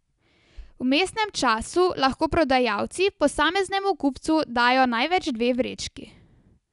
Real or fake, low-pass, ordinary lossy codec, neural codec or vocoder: real; 10.8 kHz; none; none